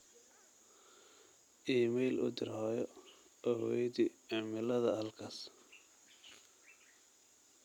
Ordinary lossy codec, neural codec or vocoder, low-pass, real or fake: none; none; 19.8 kHz; real